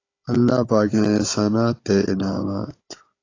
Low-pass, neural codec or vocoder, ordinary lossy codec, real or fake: 7.2 kHz; codec, 16 kHz, 16 kbps, FunCodec, trained on Chinese and English, 50 frames a second; AAC, 32 kbps; fake